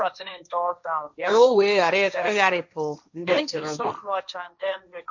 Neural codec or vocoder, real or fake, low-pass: codec, 16 kHz, 1.1 kbps, Voila-Tokenizer; fake; 7.2 kHz